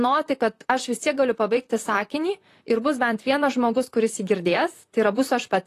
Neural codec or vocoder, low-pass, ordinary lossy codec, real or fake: vocoder, 44.1 kHz, 128 mel bands, Pupu-Vocoder; 14.4 kHz; AAC, 48 kbps; fake